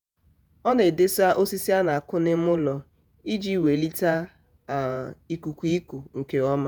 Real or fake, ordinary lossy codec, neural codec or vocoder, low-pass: fake; none; vocoder, 48 kHz, 128 mel bands, Vocos; none